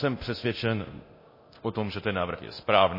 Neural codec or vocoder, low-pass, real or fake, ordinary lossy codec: codec, 24 kHz, 0.9 kbps, DualCodec; 5.4 kHz; fake; MP3, 24 kbps